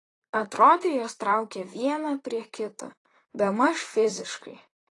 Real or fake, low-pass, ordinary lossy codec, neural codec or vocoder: fake; 10.8 kHz; AAC, 32 kbps; vocoder, 44.1 kHz, 128 mel bands, Pupu-Vocoder